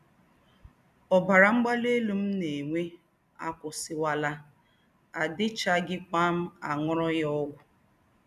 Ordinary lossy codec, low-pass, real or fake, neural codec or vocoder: none; 14.4 kHz; real; none